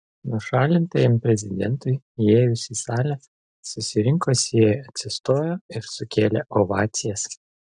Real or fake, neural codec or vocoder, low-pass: real; none; 9.9 kHz